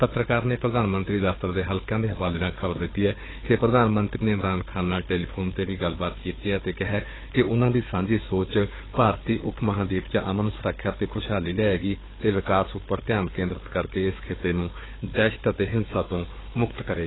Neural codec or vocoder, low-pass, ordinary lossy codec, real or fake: codec, 16 kHz, 4 kbps, FunCodec, trained on Chinese and English, 50 frames a second; 7.2 kHz; AAC, 16 kbps; fake